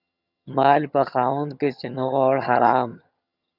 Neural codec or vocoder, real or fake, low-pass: vocoder, 22.05 kHz, 80 mel bands, HiFi-GAN; fake; 5.4 kHz